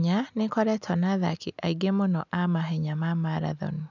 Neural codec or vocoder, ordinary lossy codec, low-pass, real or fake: none; none; 7.2 kHz; real